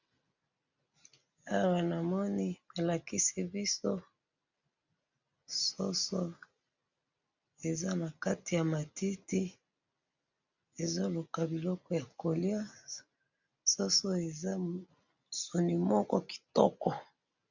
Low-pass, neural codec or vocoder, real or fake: 7.2 kHz; none; real